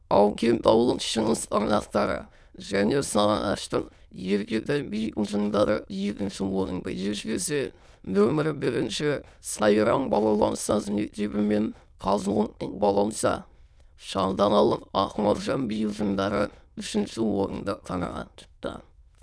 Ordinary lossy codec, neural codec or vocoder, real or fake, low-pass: none; autoencoder, 22.05 kHz, a latent of 192 numbers a frame, VITS, trained on many speakers; fake; none